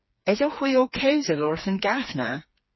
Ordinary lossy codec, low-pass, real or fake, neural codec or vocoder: MP3, 24 kbps; 7.2 kHz; fake; codec, 16 kHz, 4 kbps, FreqCodec, smaller model